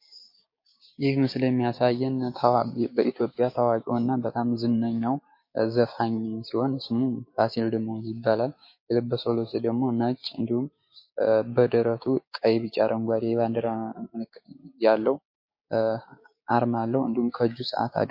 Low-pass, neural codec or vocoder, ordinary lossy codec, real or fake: 5.4 kHz; codec, 16 kHz, 6 kbps, DAC; MP3, 32 kbps; fake